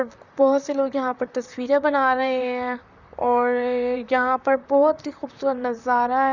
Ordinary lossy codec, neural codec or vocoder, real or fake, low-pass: none; vocoder, 44.1 kHz, 128 mel bands, Pupu-Vocoder; fake; 7.2 kHz